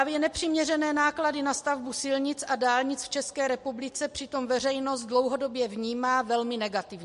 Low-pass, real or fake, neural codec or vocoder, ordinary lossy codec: 14.4 kHz; real; none; MP3, 48 kbps